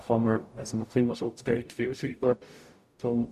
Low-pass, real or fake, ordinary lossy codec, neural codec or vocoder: 14.4 kHz; fake; none; codec, 44.1 kHz, 0.9 kbps, DAC